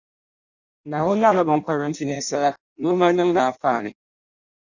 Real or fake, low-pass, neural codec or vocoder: fake; 7.2 kHz; codec, 16 kHz in and 24 kHz out, 0.6 kbps, FireRedTTS-2 codec